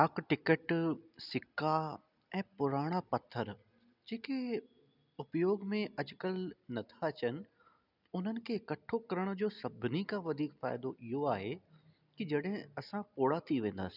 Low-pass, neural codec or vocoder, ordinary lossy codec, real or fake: 5.4 kHz; none; none; real